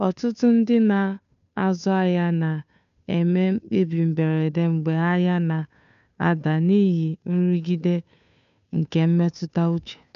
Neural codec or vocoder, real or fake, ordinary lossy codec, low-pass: codec, 16 kHz, 2 kbps, FunCodec, trained on Chinese and English, 25 frames a second; fake; none; 7.2 kHz